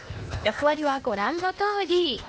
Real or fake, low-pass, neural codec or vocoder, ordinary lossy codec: fake; none; codec, 16 kHz, 0.8 kbps, ZipCodec; none